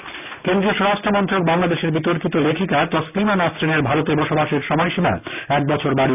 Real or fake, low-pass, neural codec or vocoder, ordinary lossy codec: real; 3.6 kHz; none; none